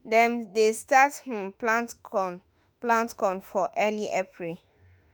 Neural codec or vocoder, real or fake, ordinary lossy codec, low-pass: autoencoder, 48 kHz, 32 numbers a frame, DAC-VAE, trained on Japanese speech; fake; none; none